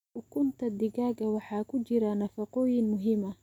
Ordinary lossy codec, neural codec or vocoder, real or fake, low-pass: none; none; real; 19.8 kHz